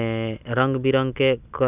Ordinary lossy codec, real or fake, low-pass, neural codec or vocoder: none; real; 3.6 kHz; none